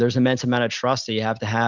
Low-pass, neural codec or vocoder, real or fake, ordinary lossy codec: 7.2 kHz; none; real; Opus, 64 kbps